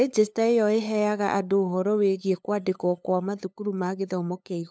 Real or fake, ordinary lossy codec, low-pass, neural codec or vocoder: fake; none; none; codec, 16 kHz, 8 kbps, FunCodec, trained on LibriTTS, 25 frames a second